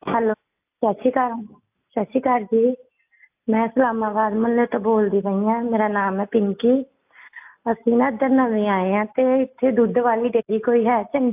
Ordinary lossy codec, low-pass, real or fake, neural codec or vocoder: AAC, 32 kbps; 3.6 kHz; real; none